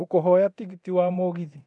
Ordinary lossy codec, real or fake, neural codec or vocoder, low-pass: none; fake; codec, 24 kHz, 0.9 kbps, DualCodec; 10.8 kHz